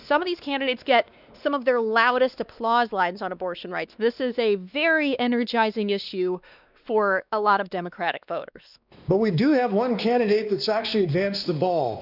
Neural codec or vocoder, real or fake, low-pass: codec, 16 kHz, 2 kbps, X-Codec, WavLM features, trained on Multilingual LibriSpeech; fake; 5.4 kHz